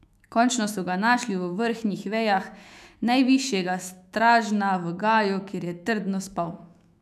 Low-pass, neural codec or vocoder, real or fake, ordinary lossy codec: 14.4 kHz; autoencoder, 48 kHz, 128 numbers a frame, DAC-VAE, trained on Japanese speech; fake; none